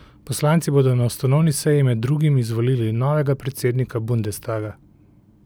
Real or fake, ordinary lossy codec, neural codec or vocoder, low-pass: real; none; none; none